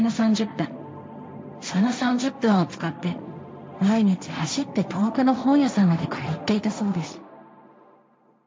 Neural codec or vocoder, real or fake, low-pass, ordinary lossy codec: codec, 16 kHz, 1.1 kbps, Voila-Tokenizer; fake; none; none